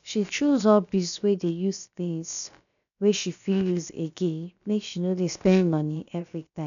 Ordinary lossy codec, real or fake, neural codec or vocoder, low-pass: none; fake; codec, 16 kHz, about 1 kbps, DyCAST, with the encoder's durations; 7.2 kHz